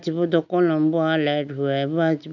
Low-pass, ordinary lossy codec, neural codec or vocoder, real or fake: 7.2 kHz; none; none; real